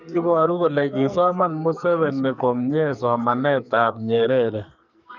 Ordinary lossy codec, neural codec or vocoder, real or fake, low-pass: none; codec, 44.1 kHz, 2.6 kbps, SNAC; fake; 7.2 kHz